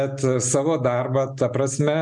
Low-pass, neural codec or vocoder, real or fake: 10.8 kHz; none; real